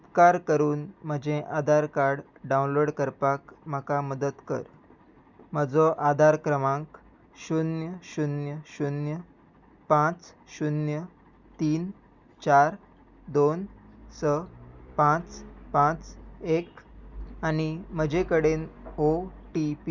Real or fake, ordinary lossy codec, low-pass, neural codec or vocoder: real; Opus, 64 kbps; 7.2 kHz; none